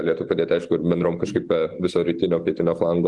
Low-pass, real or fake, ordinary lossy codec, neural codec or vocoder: 10.8 kHz; real; Opus, 24 kbps; none